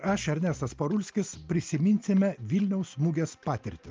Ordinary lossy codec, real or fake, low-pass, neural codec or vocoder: Opus, 24 kbps; real; 7.2 kHz; none